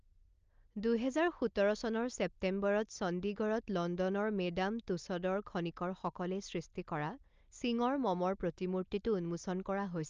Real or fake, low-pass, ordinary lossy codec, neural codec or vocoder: real; 7.2 kHz; Opus, 24 kbps; none